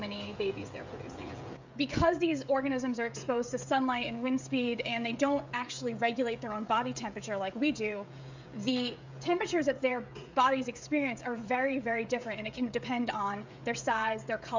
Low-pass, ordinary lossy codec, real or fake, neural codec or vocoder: 7.2 kHz; MP3, 64 kbps; fake; codec, 16 kHz, 16 kbps, FreqCodec, smaller model